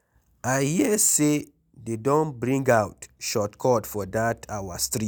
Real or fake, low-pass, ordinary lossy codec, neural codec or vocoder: real; none; none; none